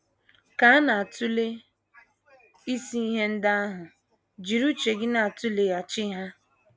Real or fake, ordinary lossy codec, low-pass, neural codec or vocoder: real; none; none; none